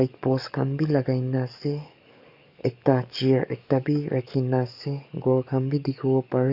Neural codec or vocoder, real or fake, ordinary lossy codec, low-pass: codec, 44.1 kHz, 7.8 kbps, DAC; fake; none; 5.4 kHz